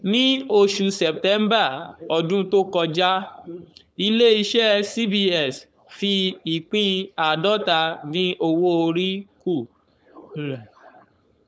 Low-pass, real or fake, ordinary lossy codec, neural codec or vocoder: none; fake; none; codec, 16 kHz, 4.8 kbps, FACodec